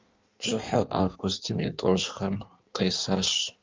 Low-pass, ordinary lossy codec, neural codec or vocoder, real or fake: 7.2 kHz; Opus, 32 kbps; codec, 16 kHz in and 24 kHz out, 1.1 kbps, FireRedTTS-2 codec; fake